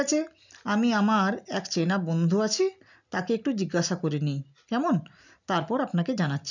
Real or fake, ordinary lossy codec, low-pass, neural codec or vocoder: real; none; 7.2 kHz; none